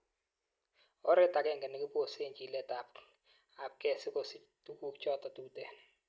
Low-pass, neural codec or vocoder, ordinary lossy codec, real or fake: none; none; none; real